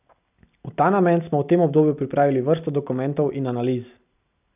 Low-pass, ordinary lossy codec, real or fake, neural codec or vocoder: 3.6 kHz; none; real; none